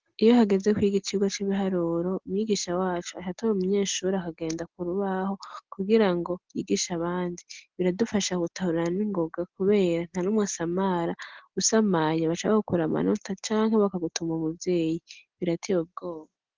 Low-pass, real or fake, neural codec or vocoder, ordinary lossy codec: 7.2 kHz; real; none; Opus, 16 kbps